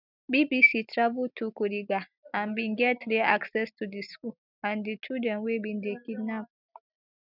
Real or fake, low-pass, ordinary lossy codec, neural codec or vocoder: real; 5.4 kHz; none; none